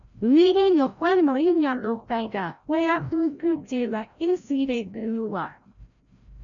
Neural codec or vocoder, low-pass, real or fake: codec, 16 kHz, 0.5 kbps, FreqCodec, larger model; 7.2 kHz; fake